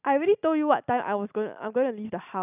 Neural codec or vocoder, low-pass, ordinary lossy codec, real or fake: none; 3.6 kHz; none; real